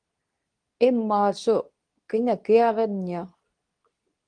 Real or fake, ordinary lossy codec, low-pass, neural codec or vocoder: fake; Opus, 24 kbps; 9.9 kHz; codec, 24 kHz, 0.9 kbps, WavTokenizer, medium speech release version 2